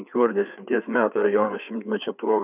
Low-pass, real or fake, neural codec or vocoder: 3.6 kHz; fake; codec, 16 kHz, 4 kbps, FreqCodec, larger model